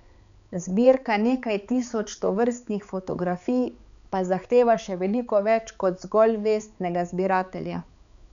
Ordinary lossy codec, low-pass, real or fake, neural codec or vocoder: none; 7.2 kHz; fake; codec, 16 kHz, 4 kbps, X-Codec, HuBERT features, trained on balanced general audio